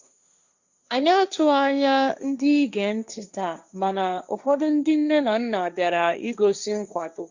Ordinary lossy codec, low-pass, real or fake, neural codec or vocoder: Opus, 64 kbps; 7.2 kHz; fake; codec, 16 kHz, 1.1 kbps, Voila-Tokenizer